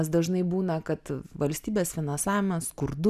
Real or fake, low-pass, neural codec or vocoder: real; 14.4 kHz; none